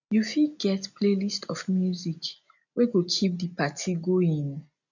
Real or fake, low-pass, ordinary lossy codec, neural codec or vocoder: real; 7.2 kHz; none; none